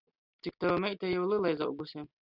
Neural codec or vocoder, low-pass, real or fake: none; 5.4 kHz; real